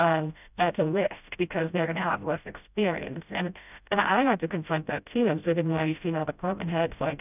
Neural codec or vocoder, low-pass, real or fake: codec, 16 kHz, 0.5 kbps, FreqCodec, smaller model; 3.6 kHz; fake